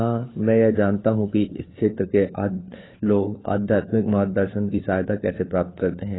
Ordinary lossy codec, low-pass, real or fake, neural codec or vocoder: AAC, 16 kbps; 7.2 kHz; fake; codec, 16 kHz, 4 kbps, FunCodec, trained on LibriTTS, 50 frames a second